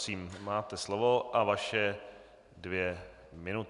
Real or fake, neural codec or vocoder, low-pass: real; none; 10.8 kHz